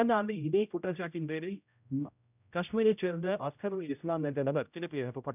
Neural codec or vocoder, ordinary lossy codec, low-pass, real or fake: codec, 16 kHz, 0.5 kbps, X-Codec, HuBERT features, trained on general audio; none; 3.6 kHz; fake